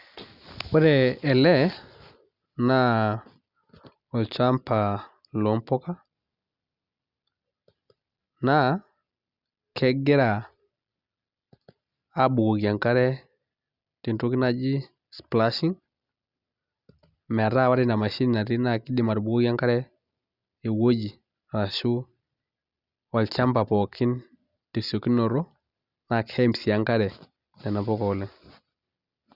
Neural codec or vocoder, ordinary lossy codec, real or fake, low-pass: none; Opus, 64 kbps; real; 5.4 kHz